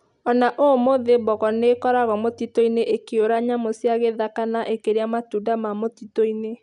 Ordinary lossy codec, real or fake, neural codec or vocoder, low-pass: none; real; none; 10.8 kHz